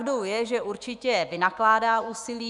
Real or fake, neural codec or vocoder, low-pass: fake; autoencoder, 48 kHz, 128 numbers a frame, DAC-VAE, trained on Japanese speech; 10.8 kHz